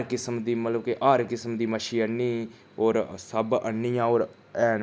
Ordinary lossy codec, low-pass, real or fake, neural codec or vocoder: none; none; real; none